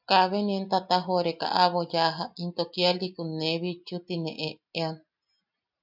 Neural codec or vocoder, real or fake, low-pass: none; real; 5.4 kHz